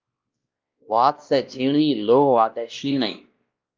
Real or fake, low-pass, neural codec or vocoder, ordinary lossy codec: fake; 7.2 kHz; codec, 16 kHz, 1 kbps, X-Codec, WavLM features, trained on Multilingual LibriSpeech; Opus, 24 kbps